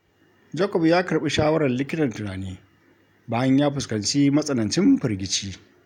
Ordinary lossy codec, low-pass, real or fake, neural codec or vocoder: none; 19.8 kHz; real; none